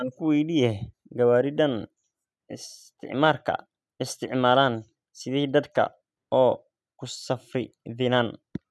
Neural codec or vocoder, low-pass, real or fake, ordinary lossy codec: none; none; real; none